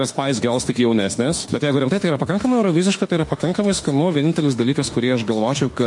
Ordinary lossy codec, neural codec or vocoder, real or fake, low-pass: MP3, 48 kbps; autoencoder, 48 kHz, 32 numbers a frame, DAC-VAE, trained on Japanese speech; fake; 10.8 kHz